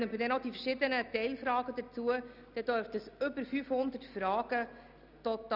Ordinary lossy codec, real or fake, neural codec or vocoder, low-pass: none; real; none; 5.4 kHz